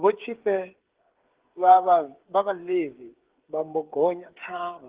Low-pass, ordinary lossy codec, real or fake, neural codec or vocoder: 3.6 kHz; Opus, 16 kbps; fake; codec, 16 kHz, 8 kbps, FreqCodec, smaller model